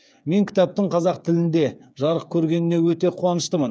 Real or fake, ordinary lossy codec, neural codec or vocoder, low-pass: fake; none; codec, 16 kHz, 8 kbps, FreqCodec, smaller model; none